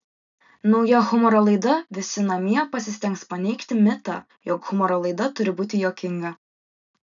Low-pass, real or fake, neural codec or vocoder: 7.2 kHz; real; none